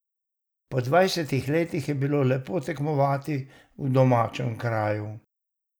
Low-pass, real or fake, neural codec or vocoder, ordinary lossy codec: none; real; none; none